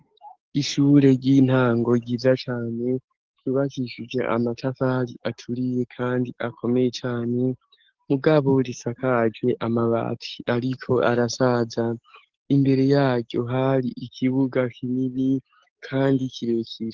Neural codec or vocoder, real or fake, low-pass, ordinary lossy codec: codec, 44.1 kHz, 7.8 kbps, DAC; fake; 7.2 kHz; Opus, 16 kbps